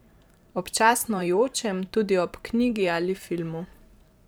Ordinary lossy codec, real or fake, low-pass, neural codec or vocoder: none; fake; none; vocoder, 44.1 kHz, 128 mel bands every 512 samples, BigVGAN v2